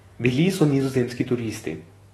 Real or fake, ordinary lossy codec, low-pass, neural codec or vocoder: fake; AAC, 32 kbps; 19.8 kHz; autoencoder, 48 kHz, 128 numbers a frame, DAC-VAE, trained on Japanese speech